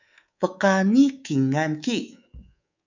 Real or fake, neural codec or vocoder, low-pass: fake; codec, 24 kHz, 3.1 kbps, DualCodec; 7.2 kHz